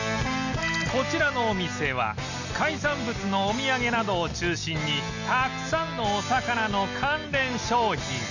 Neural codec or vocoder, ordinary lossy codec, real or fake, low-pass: none; none; real; 7.2 kHz